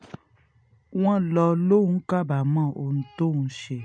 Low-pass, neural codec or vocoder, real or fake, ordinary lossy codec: 9.9 kHz; none; real; none